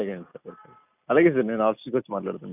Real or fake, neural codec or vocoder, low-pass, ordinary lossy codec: real; none; 3.6 kHz; none